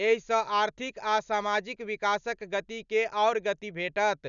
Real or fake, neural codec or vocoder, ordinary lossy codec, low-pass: real; none; none; 7.2 kHz